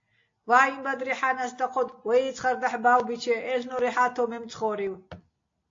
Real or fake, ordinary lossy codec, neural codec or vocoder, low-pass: real; AAC, 48 kbps; none; 7.2 kHz